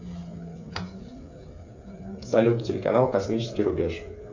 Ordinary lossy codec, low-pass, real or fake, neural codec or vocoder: AAC, 48 kbps; 7.2 kHz; fake; codec, 16 kHz, 8 kbps, FreqCodec, smaller model